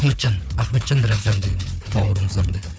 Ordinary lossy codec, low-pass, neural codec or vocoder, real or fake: none; none; codec, 16 kHz, 4 kbps, FunCodec, trained on Chinese and English, 50 frames a second; fake